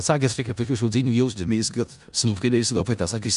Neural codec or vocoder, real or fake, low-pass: codec, 16 kHz in and 24 kHz out, 0.4 kbps, LongCat-Audio-Codec, four codebook decoder; fake; 10.8 kHz